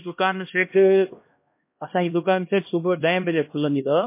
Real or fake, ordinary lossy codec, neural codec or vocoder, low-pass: fake; MP3, 24 kbps; codec, 16 kHz, 1 kbps, X-Codec, HuBERT features, trained on LibriSpeech; 3.6 kHz